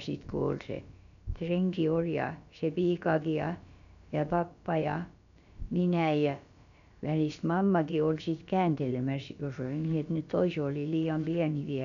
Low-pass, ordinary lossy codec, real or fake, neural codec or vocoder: 7.2 kHz; none; fake; codec, 16 kHz, about 1 kbps, DyCAST, with the encoder's durations